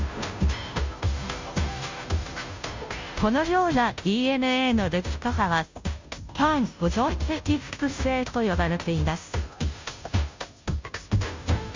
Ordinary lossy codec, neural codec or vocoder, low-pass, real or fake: MP3, 64 kbps; codec, 16 kHz, 0.5 kbps, FunCodec, trained on Chinese and English, 25 frames a second; 7.2 kHz; fake